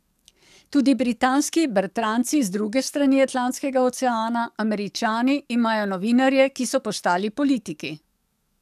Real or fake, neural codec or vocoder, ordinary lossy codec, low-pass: fake; codec, 44.1 kHz, 7.8 kbps, DAC; none; 14.4 kHz